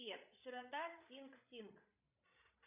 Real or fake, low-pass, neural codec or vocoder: fake; 3.6 kHz; codec, 16 kHz, 16 kbps, FreqCodec, larger model